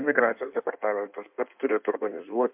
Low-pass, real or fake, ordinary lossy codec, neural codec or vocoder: 3.6 kHz; fake; MP3, 32 kbps; codec, 16 kHz in and 24 kHz out, 1.1 kbps, FireRedTTS-2 codec